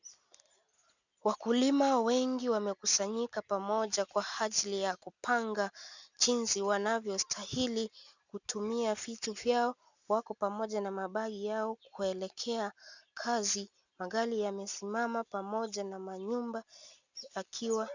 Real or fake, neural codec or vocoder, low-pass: real; none; 7.2 kHz